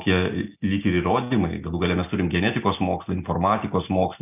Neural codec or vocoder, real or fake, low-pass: none; real; 3.6 kHz